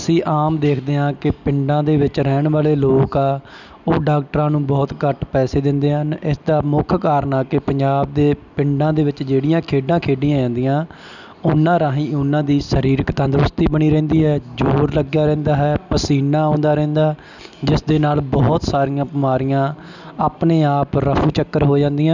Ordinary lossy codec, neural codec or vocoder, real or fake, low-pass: none; none; real; 7.2 kHz